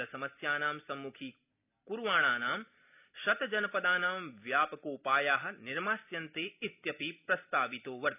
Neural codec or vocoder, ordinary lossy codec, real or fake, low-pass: none; none; real; 3.6 kHz